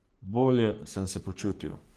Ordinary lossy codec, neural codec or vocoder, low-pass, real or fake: Opus, 24 kbps; codec, 44.1 kHz, 3.4 kbps, Pupu-Codec; 14.4 kHz; fake